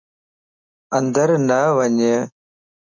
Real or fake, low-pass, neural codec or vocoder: real; 7.2 kHz; none